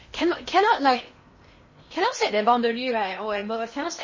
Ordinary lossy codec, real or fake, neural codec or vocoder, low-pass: MP3, 32 kbps; fake; codec, 16 kHz in and 24 kHz out, 0.8 kbps, FocalCodec, streaming, 65536 codes; 7.2 kHz